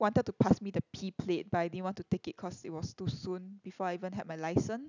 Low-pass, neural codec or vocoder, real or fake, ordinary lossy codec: 7.2 kHz; none; real; none